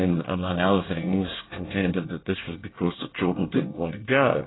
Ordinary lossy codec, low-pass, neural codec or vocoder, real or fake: AAC, 16 kbps; 7.2 kHz; codec, 24 kHz, 1 kbps, SNAC; fake